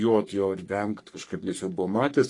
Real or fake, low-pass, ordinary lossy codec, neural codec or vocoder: fake; 10.8 kHz; AAC, 48 kbps; codec, 44.1 kHz, 3.4 kbps, Pupu-Codec